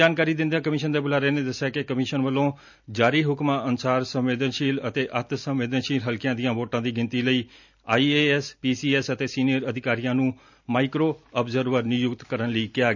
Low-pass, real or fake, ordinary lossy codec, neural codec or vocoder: 7.2 kHz; real; none; none